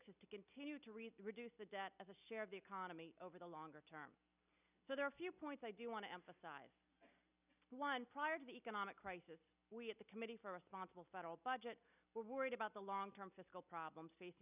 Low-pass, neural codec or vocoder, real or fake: 3.6 kHz; none; real